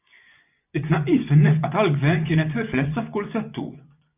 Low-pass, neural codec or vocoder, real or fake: 3.6 kHz; vocoder, 24 kHz, 100 mel bands, Vocos; fake